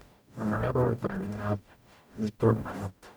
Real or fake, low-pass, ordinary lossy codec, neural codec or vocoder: fake; none; none; codec, 44.1 kHz, 0.9 kbps, DAC